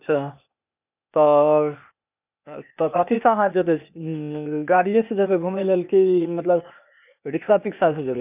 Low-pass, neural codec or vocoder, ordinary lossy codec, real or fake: 3.6 kHz; codec, 16 kHz, 0.8 kbps, ZipCodec; none; fake